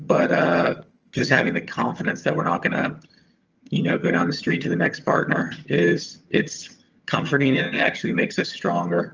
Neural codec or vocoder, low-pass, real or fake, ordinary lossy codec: vocoder, 22.05 kHz, 80 mel bands, HiFi-GAN; 7.2 kHz; fake; Opus, 24 kbps